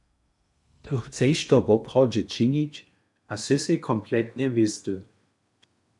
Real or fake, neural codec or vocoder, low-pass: fake; codec, 16 kHz in and 24 kHz out, 0.8 kbps, FocalCodec, streaming, 65536 codes; 10.8 kHz